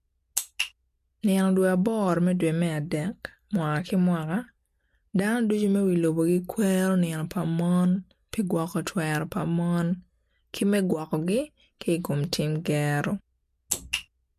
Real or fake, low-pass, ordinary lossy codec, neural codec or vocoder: real; 14.4 kHz; MP3, 64 kbps; none